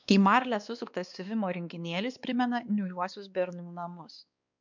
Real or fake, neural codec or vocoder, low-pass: fake; codec, 16 kHz, 2 kbps, X-Codec, WavLM features, trained on Multilingual LibriSpeech; 7.2 kHz